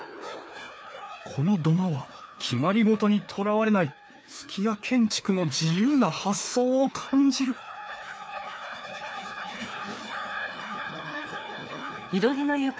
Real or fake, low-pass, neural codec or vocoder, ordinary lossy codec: fake; none; codec, 16 kHz, 2 kbps, FreqCodec, larger model; none